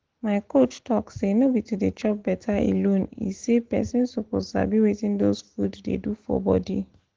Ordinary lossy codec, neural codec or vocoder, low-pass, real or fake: Opus, 16 kbps; none; 7.2 kHz; real